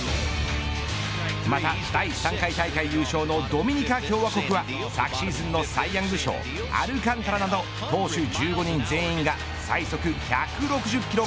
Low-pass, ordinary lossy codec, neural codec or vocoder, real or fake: none; none; none; real